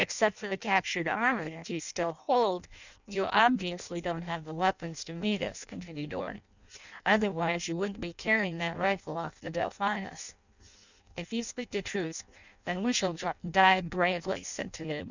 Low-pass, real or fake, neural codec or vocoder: 7.2 kHz; fake; codec, 16 kHz in and 24 kHz out, 0.6 kbps, FireRedTTS-2 codec